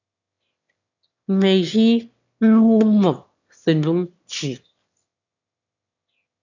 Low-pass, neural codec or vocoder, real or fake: 7.2 kHz; autoencoder, 22.05 kHz, a latent of 192 numbers a frame, VITS, trained on one speaker; fake